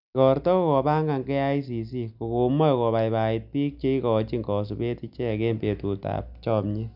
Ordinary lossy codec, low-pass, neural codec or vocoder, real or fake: none; 5.4 kHz; none; real